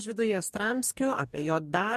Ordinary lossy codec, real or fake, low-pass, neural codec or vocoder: MP3, 64 kbps; fake; 14.4 kHz; codec, 44.1 kHz, 2.6 kbps, DAC